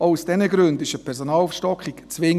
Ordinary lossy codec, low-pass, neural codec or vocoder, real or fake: none; 14.4 kHz; none; real